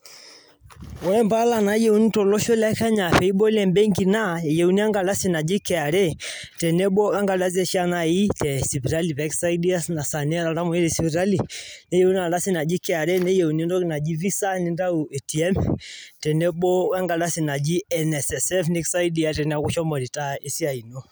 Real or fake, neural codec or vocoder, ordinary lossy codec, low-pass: real; none; none; none